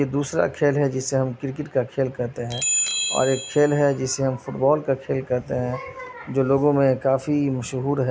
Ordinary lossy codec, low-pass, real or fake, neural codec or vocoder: none; none; real; none